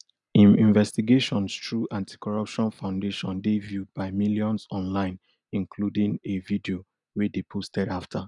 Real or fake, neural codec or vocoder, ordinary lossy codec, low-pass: real; none; none; 10.8 kHz